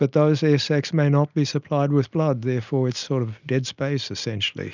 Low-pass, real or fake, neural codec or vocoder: 7.2 kHz; real; none